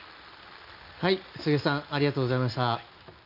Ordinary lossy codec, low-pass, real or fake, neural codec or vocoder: none; 5.4 kHz; real; none